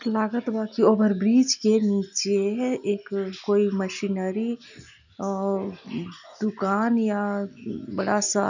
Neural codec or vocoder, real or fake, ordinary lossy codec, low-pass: none; real; none; 7.2 kHz